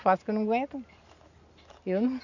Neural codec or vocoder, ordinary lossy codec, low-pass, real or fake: none; none; 7.2 kHz; real